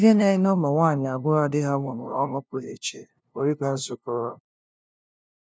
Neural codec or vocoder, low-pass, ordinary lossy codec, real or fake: codec, 16 kHz, 0.5 kbps, FunCodec, trained on LibriTTS, 25 frames a second; none; none; fake